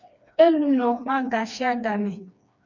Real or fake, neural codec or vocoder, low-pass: fake; codec, 16 kHz, 2 kbps, FreqCodec, smaller model; 7.2 kHz